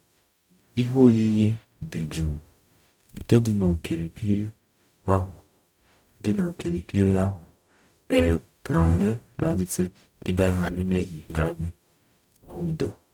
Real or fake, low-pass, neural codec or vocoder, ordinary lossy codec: fake; 19.8 kHz; codec, 44.1 kHz, 0.9 kbps, DAC; none